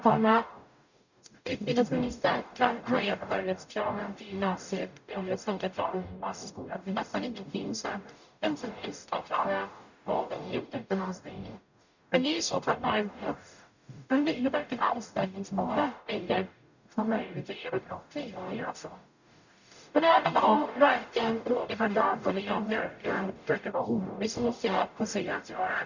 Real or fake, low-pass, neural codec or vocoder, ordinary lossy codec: fake; 7.2 kHz; codec, 44.1 kHz, 0.9 kbps, DAC; none